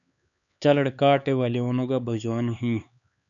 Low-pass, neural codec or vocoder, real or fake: 7.2 kHz; codec, 16 kHz, 4 kbps, X-Codec, HuBERT features, trained on LibriSpeech; fake